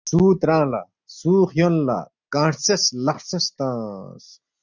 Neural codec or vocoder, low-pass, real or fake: none; 7.2 kHz; real